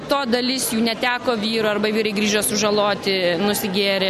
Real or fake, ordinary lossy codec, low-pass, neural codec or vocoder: real; MP3, 96 kbps; 14.4 kHz; none